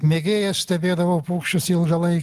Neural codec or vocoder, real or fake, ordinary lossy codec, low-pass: none; real; Opus, 24 kbps; 14.4 kHz